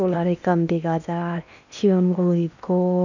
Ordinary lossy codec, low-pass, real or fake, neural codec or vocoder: none; 7.2 kHz; fake; codec, 16 kHz in and 24 kHz out, 0.8 kbps, FocalCodec, streaming, 65536 codes